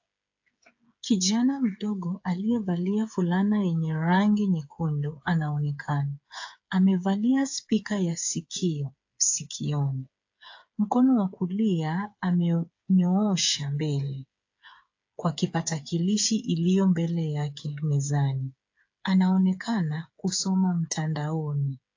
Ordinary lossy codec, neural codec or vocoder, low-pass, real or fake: AAC, 48 kbps; codec, 16 kHz, 8 kbps, FreqCodec, smaller model; 7.2 kHz; fake